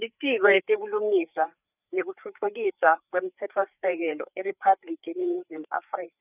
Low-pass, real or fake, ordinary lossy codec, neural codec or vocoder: 3.6 kHz; fake; none; codec, 16 kHz, 4 kbps, FreqCodec, larger model